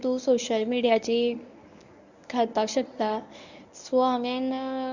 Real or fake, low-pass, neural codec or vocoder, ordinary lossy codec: fake; 7.2 kHz; codec, 24 kHz, 0.9 kbps, WavTokenizer, medium speech release version 1; none